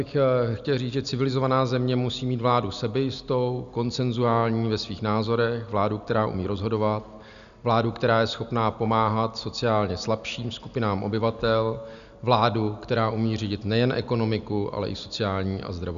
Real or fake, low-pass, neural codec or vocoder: real; 7.2 kHz; none